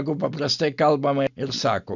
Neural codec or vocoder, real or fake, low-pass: none; real; 7.2 kHz